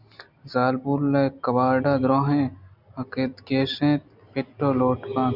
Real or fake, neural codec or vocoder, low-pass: real; none; 5.4 kHz